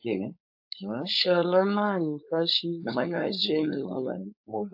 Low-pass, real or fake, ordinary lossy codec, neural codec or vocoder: 5.4 kHz; fake; AAC, 48 kbps; codec, 16 kHz, 4.8 kbps, FACodec